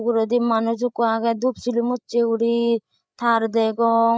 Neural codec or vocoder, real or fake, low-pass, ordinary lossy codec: codec, 16 kHz, 8 kbps, FreqCodec, larger model; fake; none; none